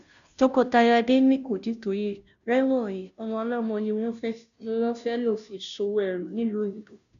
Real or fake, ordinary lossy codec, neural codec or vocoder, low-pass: fake; none; codec, 16 kHz, 0.5 kbps, FunCodec, trained on Chinese and English, 25 frames a second; 7.2 kHz